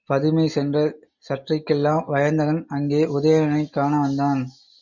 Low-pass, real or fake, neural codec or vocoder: 7.2 kHz; real; none